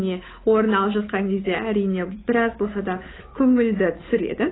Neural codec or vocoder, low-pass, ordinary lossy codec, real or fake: codec, 16 kHz, 8 kbps, FunCodec, trained on Chinese and English, 25 frames a second; 7.2 kHz; AAC, 16 kbps; fake